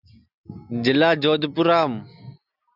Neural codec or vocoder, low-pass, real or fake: none; 5.4 kHz; real